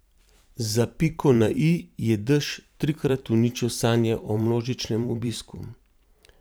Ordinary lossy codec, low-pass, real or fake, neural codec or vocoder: none; none; fake; vocoder, 44.1 kHz, 128 mel bands every 512 samples, BigVGAN v2